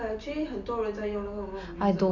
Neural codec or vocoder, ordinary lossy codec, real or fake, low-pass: none; none; real; 7.2 kHz